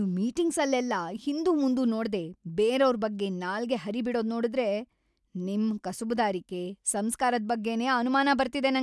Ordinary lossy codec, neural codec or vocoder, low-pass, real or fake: none; none; none; real